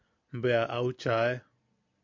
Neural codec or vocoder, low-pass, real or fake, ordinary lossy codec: none; 7.2 kHz; real; MP3, 48 kbps